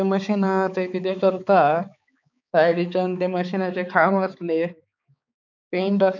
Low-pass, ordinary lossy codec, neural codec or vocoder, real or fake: 7.2 kHz; none; codec, 16 kHz, 4 kbps, X-Codec, HuBERT features, trained on balanced general audio; fake